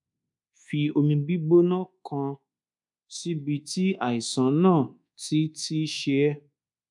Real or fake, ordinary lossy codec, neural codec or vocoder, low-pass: fake; MP3, 96 kbps; codec, 24 kHz, 1.2 kbps, DualCodec; 10.8 kHz